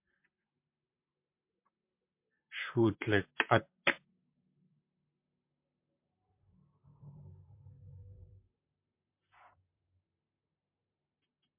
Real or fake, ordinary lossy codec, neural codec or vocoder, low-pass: fake; MP3, 32 kbps; vocoder, 44.1 kHz, 128 mel bands, Pupu-Vocoder; 3.6 kHz